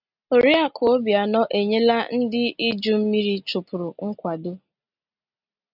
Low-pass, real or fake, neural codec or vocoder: 5.4 kHz; real; none